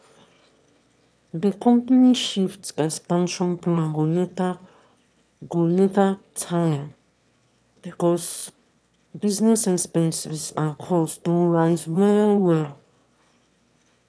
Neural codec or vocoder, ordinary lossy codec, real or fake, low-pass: autoencoder, 22.05 kHz, a latent of 192 numbers a frame, VITS, trained on one speaker; none; fake; none